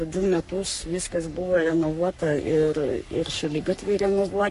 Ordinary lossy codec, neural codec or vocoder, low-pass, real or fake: MP3, 48 kbps; codec, 44.1 kHz, 3.4 kbps, Pupu-Codec; 14.4 kHz; fake